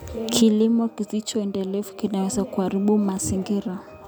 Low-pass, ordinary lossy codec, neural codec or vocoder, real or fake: none; none; none; real